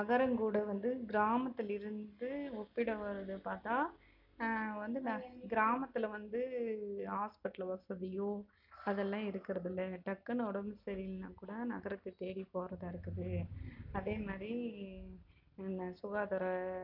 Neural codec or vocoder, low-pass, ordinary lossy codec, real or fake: none; 5.4 kHz; none; real